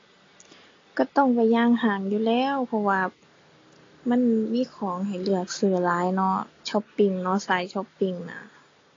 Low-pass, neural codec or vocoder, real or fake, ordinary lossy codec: 7.2 kHz; none; real; AAC, 32 kbps